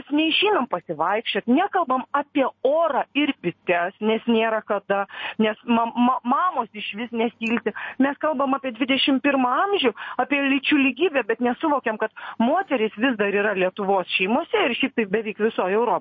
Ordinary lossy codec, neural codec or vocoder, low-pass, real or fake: MP3, 32 kbps; none; 7.2 kHz; real